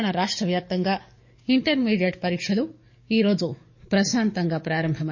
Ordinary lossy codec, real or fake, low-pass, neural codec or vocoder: MP3, 32 kbps; fake; 7.2 kHz; codec, 44.1 kHz, 7.8 kbps, DAC